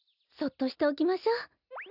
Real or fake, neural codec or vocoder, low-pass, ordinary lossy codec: real; none; 5.4 kHz; none